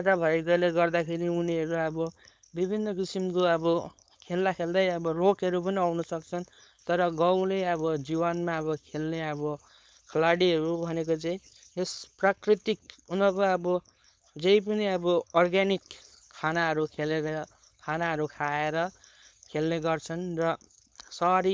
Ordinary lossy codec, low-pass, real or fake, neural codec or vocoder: none; none; fake; codec, 16 kHz, 4.8 kbps, FACodec